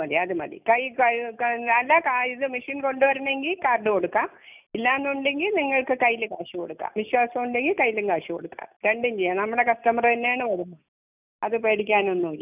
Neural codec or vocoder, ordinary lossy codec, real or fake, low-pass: none; none; real; 3.6 kHz